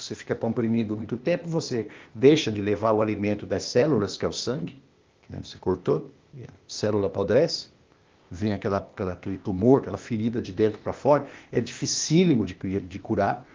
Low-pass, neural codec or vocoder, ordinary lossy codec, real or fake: 7.2 kHz; codec, 16 kHz, 0.8 kbps, ZipCodec; Opus, 32 kbps; fake